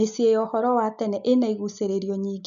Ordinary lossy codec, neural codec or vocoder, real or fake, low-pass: none; none; real; 7.2 kHz